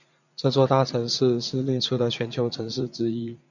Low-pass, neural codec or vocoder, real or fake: 7.2 kHz; vocoder, 44.1 kHz, 80 mel bands, Vocos; fake